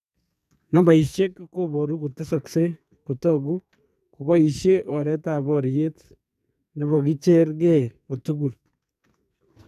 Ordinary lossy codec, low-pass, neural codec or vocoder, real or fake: none; 14.4 kHz; codec, 44.1 kHz, 2.6 kbps, SNAC; fake